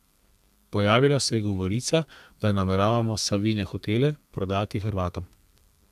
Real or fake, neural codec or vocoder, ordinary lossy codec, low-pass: fake; codec, 32 kHz, 1.9 kbps, SNAC; none; 14.4 kHz